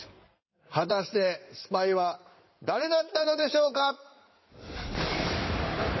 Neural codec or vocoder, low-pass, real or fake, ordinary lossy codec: codec, 16 kHz in and 24 kHz out, 2.2 kbps, FireRedTTS-2 codec; 7.2 kHz; fake; MP3, 24 kbps